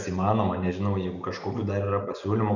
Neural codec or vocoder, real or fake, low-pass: none; real; 7.2 kHz